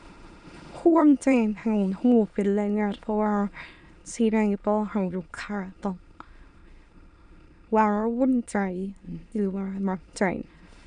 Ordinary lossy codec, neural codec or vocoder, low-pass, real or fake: none; autoencoder, 22.05 kHz, a latent of 192 numbers a frame, VITS, trained on many speakers; 9.9 kHz; fake